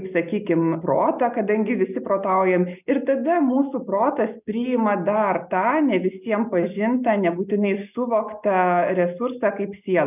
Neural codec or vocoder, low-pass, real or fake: none; 3.6 kHz; real